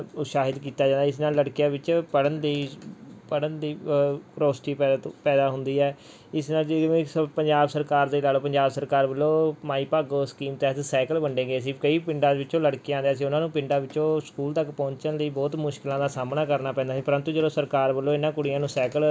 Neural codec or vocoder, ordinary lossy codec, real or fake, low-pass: none; none; real; none